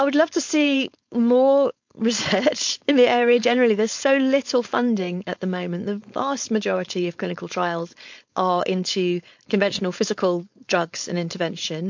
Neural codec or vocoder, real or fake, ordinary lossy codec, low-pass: codec, 16 kHz, 4.8 kbps, FACodec; fake; MP3, 48 kbps; 7.2 kHz